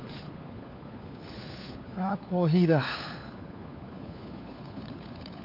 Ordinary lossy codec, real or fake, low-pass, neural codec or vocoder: none; fake; 5.4 kHz; codec, 16 kHz, 4 kbps, FunCodec, trained on LibriTTS, 50 frames a second